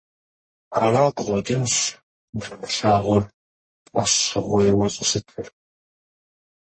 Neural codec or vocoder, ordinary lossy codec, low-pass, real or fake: codec, 44.1 kHz, 1.7 kbps, Pupu-Codec; MP3, 32 kbps; 9.9 kHz; fake